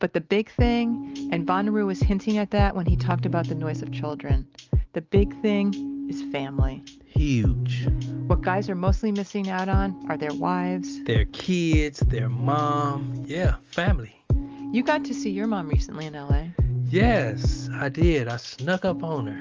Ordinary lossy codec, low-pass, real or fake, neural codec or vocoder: Opus, 32 kbps; 7.2 kHz; real; none